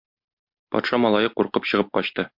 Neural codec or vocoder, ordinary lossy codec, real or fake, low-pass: none; MP3, 48 kbps; real; 5.4 kHz